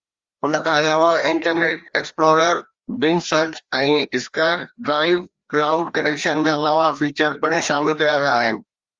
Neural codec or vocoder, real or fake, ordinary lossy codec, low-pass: codec, 16 kHz, 1 kbps, FreqCodec, larger model; fake; Opus, 32 kbps; 7.2 kHz